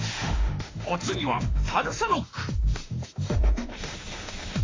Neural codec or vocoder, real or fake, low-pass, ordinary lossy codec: autoencoder, 48 kHz, 32 numbers a frame, DAC-VAE, trained on Japanese speech; fake; 7.2 kHz; AAC, 32 kbps